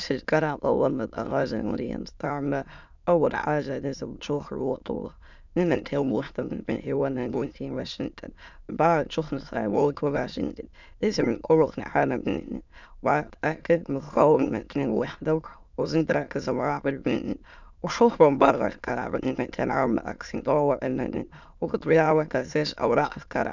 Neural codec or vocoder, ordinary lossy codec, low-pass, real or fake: autoencoder, 22.05 kHz, a latent of 192 numbers a frame, VITS, trained on many speakers; none; 7.2 kHz; fake